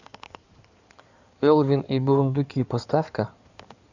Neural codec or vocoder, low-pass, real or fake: codec, 16 kHz in and 24 kHz out, 2.2 kbps, FireRedTTS-2 codec; 7.2 kHz; fake